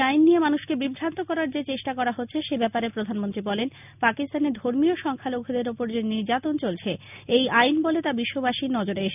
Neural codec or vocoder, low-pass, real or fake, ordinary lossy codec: none; 3.6 kHz; real; none